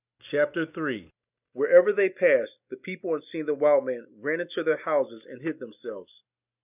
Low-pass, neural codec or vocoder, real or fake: 3.6 kHz; none; real